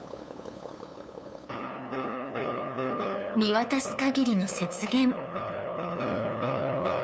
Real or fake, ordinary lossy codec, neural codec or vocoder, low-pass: fake; none; codec, 16 kHz, 2 kbps, FunCodec, trained on LibriTTS, 25 frames a second; none